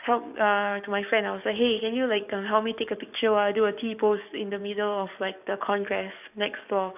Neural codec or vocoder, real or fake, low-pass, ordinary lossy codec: codec, 44.1 kHz, 7.8 kbps, DAC; fake; 3.6 kHz; none